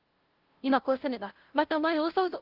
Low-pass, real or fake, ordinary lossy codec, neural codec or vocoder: 5.4 kHz; fake; Opus, 16 kbps; codec, 16 kHz, 0.5 kbps, FunCodec, trained on LibriTTS, 25 frames a second